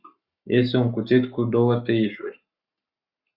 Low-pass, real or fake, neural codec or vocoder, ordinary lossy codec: 5.4 kHz; fake; codec, 44.1 kHz, 7.8 kbps, Pupu-Codec; Opus, 64 kbps